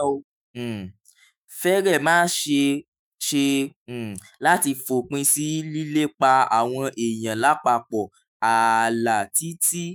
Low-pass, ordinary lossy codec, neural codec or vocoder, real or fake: none; none; autoencoder, 48 kHz, 128 numbers a frame, DAC-VAE, trained on Japanese speech; fake